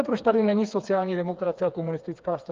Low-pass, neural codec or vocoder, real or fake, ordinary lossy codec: 7.2 kHz; codec, 16 kHz, 4 kbps, FreqCodec, smaller model; fake; Opus, 32 kbps